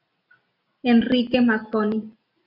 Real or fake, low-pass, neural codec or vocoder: real; 5.4 kHz; none